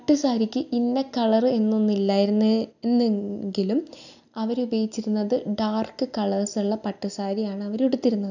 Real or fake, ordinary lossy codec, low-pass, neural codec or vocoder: real; none; 7.2 kHz; none